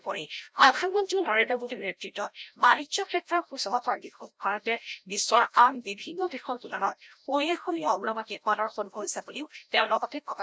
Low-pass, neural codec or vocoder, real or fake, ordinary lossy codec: none; codec, 16 kHz, 0.5 kbps, FreqCodec, larger model; fake; none